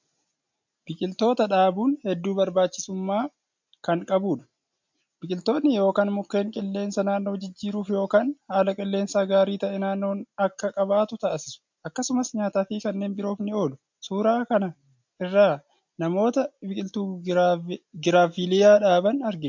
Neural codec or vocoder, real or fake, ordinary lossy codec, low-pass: none; real; MP3, 64 kbps; 7.2 kHz